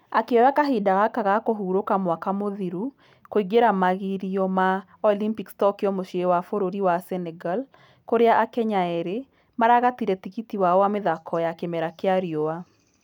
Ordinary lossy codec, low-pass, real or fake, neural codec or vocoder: none; 19.8 kHz; real; none